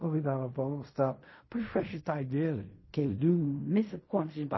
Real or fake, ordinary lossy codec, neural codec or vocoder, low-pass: fake; MP3, 24 kbps; codec, 16 kHz in and 24 kHz out, 0.4 kbps, LongCat-Audio-Codec, fine tuned four codebook decoder; 7.2 kHz